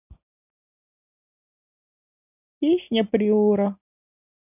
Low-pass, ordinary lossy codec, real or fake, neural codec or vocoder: 3.6 kHz; none; real; none